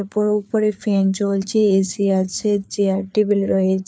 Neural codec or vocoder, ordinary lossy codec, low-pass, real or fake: codec, 16 kHz, 4 kbps, FreqCodec, larger model; none; none; fake